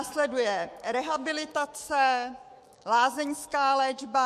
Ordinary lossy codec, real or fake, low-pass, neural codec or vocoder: MP3, 64 kbps; fake; 14.4 kHz; autoencoder, 48 kHz, 128 numbers a frame, DAC-VAE, trained on Japanese speech